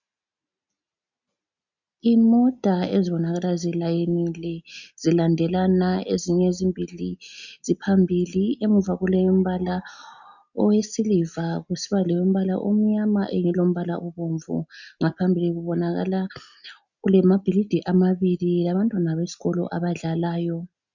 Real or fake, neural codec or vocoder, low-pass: real; none; 7.2 kHz